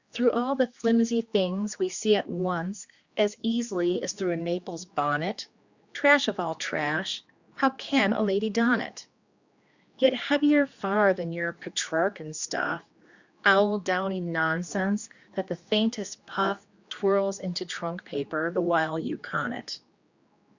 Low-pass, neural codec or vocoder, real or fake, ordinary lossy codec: 7.2 kHz; codec, 16 kHz, 2 kbps, X-Codec, HuBERT features, trained on general audio; fake; Opus, 64 kbps